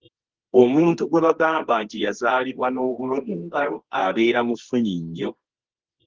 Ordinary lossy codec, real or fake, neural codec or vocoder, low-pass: Opus, 16 kbps; fake; codec, 24 kHz, 0.9 kbps, WavTokenizer, medium music audio release; 7.2 kHz